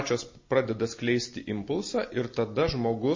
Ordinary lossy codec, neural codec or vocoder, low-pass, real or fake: MP3, 32 kbps; none; 7.2 kHz; real